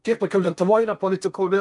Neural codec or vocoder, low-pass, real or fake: codec, 16 kHz in and 24 kHz out, 0.8 kbps, FocalCodec, streaming, 65536 codes; 10.8 kHz; fake